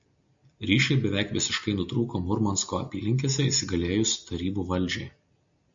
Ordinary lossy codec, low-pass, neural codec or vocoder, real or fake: MP3, 64 kbps; 7.2 kHz; none; real